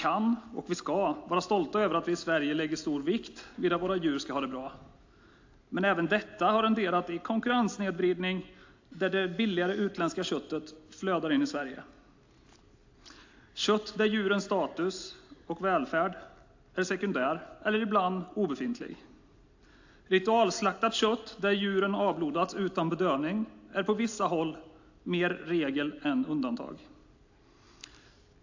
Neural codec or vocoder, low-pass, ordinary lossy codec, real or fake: none; 7.2 kHz; AAC, 48 kbps; real